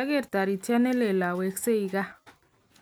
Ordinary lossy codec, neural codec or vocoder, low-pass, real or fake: none; none; none; real